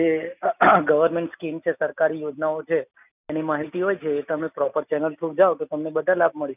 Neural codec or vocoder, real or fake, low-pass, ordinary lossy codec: none; real; 3.6 kHz; none